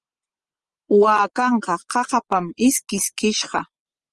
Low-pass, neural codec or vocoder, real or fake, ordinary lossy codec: 10.8 kHz; vocoder, 24 kHz, 100 mel bands, Vocos; fake; Opus, 24 kbps